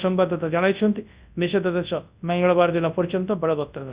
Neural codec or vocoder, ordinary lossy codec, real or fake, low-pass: codec, 24 kHz, 0.9 kbps, WavTokenizer, large speech release; Opus, 64 kbps; fake; 3.6 kHz